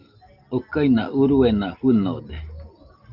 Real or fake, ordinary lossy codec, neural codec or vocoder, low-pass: real; Opus, 24 kbps; none; 5.4 kHz